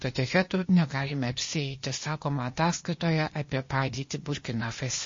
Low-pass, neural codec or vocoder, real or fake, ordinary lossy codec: 7.2 kHz; codec, 16 kHz, 0.8 kbps, ZipCodec; fake; MP3, 32 kbps